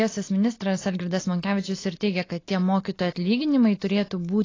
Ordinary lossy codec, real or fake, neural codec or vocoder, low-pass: AAC, 32 kbps; real; none; 7.2 kHz